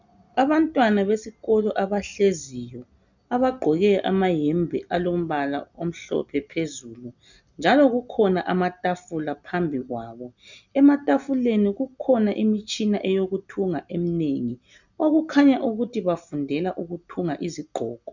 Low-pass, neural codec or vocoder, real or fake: 7.2 kHz; none; real